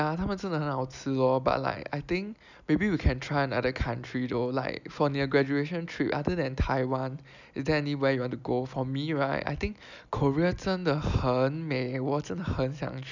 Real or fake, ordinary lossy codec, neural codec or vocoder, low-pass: real; none; none; 7.2 kHz